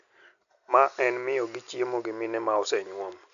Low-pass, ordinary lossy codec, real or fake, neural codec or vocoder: 7.2 kHz; none; real; none